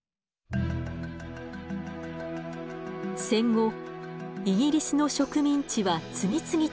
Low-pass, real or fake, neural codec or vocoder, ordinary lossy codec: none; real; none; none